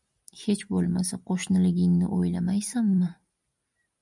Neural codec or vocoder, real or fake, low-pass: none; real; 10.8 kHz